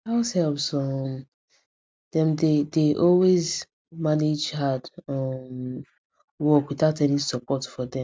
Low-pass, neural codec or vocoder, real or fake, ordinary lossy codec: none; none; real; none